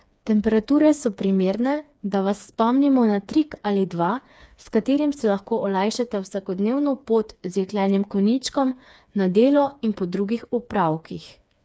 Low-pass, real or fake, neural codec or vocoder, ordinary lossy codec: none; fake; codec, 16 kHz, 4 kbps, FreqCodec, smaller model; none